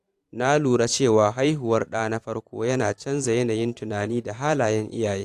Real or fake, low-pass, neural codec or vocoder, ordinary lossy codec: fake; 14.4 kHz; vocoder, 44.1 kHz, 128 mel bands every 512 samples, BigVGAN v2; AAC, 64 kbps